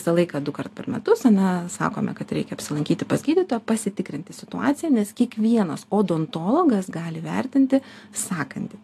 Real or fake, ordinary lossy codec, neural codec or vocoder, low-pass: real; AAC, 64 kbps; none; 14.4 kHz